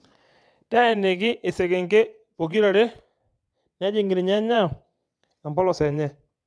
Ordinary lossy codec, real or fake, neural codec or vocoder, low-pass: none; fake; vocoder, 22.05 kHz, 80 mel bands, WaveNeXt; none